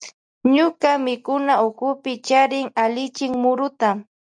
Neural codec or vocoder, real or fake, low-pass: none; real; 9.9 kHz